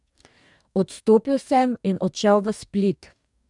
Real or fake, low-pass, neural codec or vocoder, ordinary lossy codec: fake; 10.8 kHz; codec, 44.1 kHz, 2.6 kbps, DAC; none